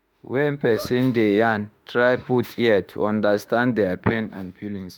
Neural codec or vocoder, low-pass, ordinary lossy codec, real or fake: autoencoder, 48 kHz, 32 numbers a frame, DAC-VAE, trained on Japanese speech; none; none; fake